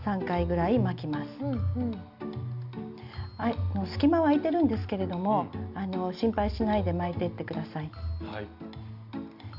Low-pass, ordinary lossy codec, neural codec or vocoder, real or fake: 5.4 kHz; Opus, 64 kbps; none; real